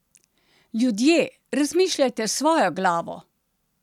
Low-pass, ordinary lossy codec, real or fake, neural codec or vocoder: 19.8 kHz; none; real; none